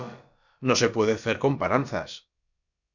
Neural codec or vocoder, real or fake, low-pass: codec, 16 kHz, about 1 kbps, DyCAST, with the encoder's durations; fake; 7.2 kHz